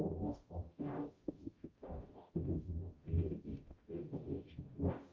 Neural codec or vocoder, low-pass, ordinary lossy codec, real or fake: codec, 44.1 kHz, 0.9 kbps, DAC; 7.2 kHz; Opus, 24 kbps; fake